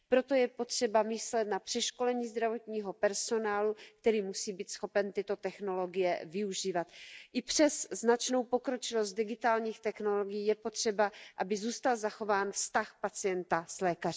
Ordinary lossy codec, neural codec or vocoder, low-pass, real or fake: none; none; none; real